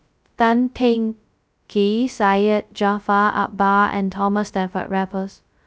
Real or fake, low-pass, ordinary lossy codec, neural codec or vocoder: fake; none; none; codec, 16 kHz, 0.2 kbps, FocalCodec